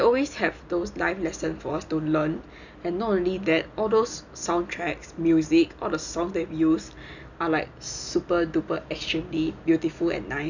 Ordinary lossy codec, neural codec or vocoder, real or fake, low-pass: none; none; real; 7.2 kHz